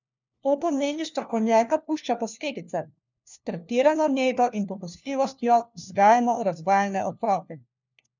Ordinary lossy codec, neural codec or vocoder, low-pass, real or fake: none; codec, 16 kHz, 1 kbps, FunCodec, trained on LibriTTS, 50 frames a second; 7.2 kHz; fake